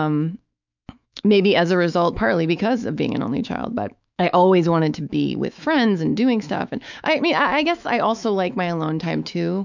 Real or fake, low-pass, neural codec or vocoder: fake; 7.2 kHz; autoencoder, 48 kHz, 128 numbers a frame, DAC-VAE, trained on Japanese speech